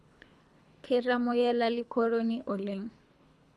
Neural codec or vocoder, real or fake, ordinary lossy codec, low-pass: codec, 24 kHz, 3 kbps, HILCodec; fake; none; none